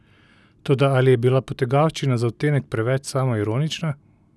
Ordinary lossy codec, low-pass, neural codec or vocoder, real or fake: none; none; none; real